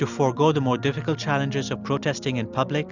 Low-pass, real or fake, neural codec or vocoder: 7.2 kHz; real; none